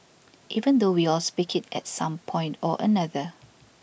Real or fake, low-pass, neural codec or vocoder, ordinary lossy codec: real; none; none; none